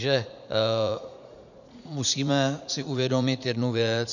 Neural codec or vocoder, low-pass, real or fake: vocoder, 44.1 kHz, 80 mel bands, Vocos; 7.2 kHz; fake